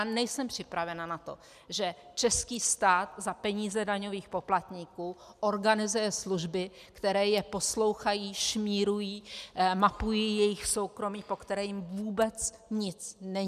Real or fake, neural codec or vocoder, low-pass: real; none; 14.4 kHz